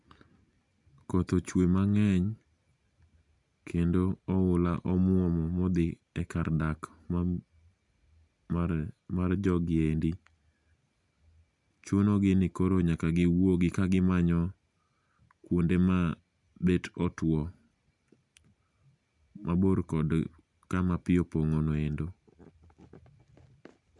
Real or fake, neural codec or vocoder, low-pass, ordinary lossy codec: real; none; 10.8 kHz; MP3, 96 kbps